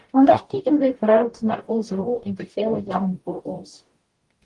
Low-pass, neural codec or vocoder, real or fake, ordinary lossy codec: 10.8 kHz; codec, 44.1 kHz, 0.9 kbps, DAC; fake; Opus, 24 kbps